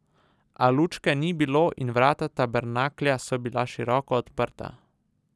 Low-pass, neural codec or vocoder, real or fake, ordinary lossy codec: none; none; real; none